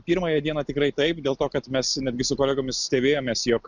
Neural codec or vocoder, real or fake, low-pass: none; real; 7.2 kHz